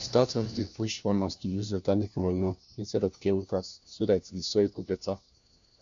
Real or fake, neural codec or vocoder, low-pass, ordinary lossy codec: fake; codec, 16 kHz, 1 kbps, FunCodec, trained on LibriTTS, 50 frames a second; 7.2 kHz; MP3, 64 kbps